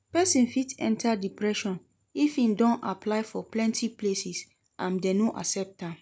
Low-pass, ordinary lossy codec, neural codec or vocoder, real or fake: none; none; none; real